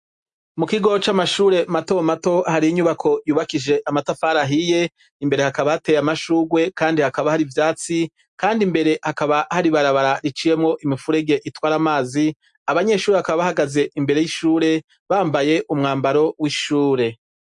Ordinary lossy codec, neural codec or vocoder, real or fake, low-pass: MP3, 64 kbps; none; real; 10.8 kHz